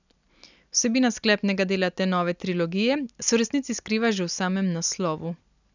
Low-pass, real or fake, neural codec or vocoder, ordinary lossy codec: 7.2 kHz; real; none; none